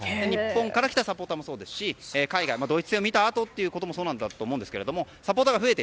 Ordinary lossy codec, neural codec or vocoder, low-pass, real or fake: none; none; none; real